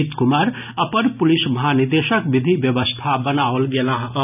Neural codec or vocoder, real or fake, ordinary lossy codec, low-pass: none; real; none; 3.6 kHz